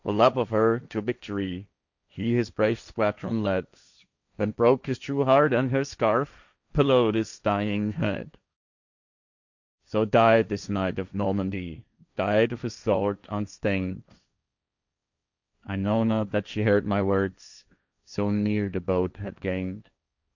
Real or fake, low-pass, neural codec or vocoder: fake; 7.2 kHz; codec, 16 kHz, 1.1 kbps, Voila-Tokenizer